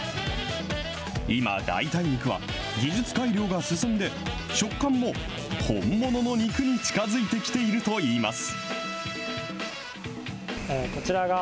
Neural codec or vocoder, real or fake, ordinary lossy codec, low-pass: none; real; none; none